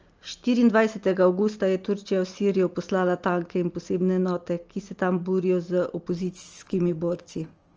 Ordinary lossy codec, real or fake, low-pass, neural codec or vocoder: Opus, 24 kbps; real; 7.2 kHz; none